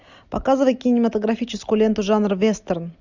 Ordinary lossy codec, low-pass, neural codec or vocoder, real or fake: Opus, 64 kbps; 7.2 kHz; none; real